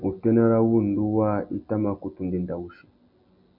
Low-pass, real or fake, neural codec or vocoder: 5.4 kHz; real; none